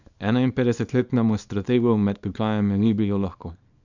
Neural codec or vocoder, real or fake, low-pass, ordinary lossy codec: codec, 24 kHz, 0.9 kbps, WavTokenizer, small release; fake; 7.2 kHz; none